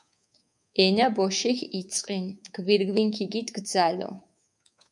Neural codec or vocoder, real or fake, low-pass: codec, 24 kHz, 3.1 kbps, DualCodec; fake; 10.8 kHz